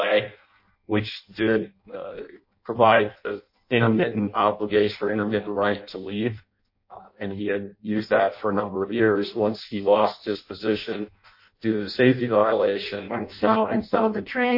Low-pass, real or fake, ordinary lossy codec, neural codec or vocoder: 5.4 kHz; fake; MP3, 32 kbps; codec, 16 kHz in and 24 kHz out, 0.6 kbps, FireRedTTS-2 codec